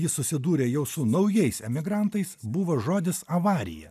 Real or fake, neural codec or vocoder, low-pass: real; none; 14.4 kHz